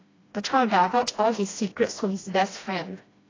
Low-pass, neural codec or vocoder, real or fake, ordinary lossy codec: 7.2 kHz; codec, 16 kHz, 1 kbps, FreqCodec, smaller model; fake; AAC, 32 kbps